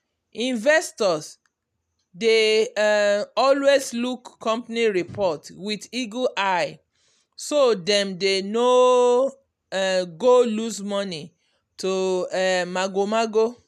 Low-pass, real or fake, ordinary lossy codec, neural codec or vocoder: 14.4 kHz; real; none; none